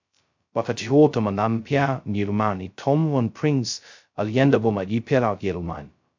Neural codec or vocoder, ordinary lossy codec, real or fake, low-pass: codec, 16 kHz, 0.2 kbps, FocalCodec; MP3, 64 kbps; fake; 7.2 kHz